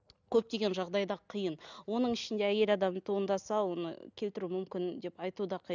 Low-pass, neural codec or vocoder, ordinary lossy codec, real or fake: 7.2 kHz; none; none; real